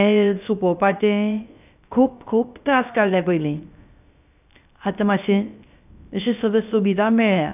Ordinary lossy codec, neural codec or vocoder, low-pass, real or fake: none; codec, 16 kHz, 0.3 kbps, FocalCodec; 3.6 kHz; fake